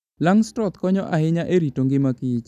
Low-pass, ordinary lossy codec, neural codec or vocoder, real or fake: 14.4 kHz; none; none; real